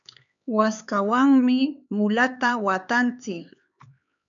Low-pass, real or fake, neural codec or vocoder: 7.2 kHz; fake; codec, 16 kHz, 4 kbps, X-Codec, HuBERT features, trained on LibriSpeech